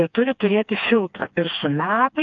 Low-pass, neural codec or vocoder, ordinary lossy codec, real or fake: 7.2 kHz; codec, 16 kHz, 2 kbps, FreqCodec, smaller model; AAC, 64 kbps; fake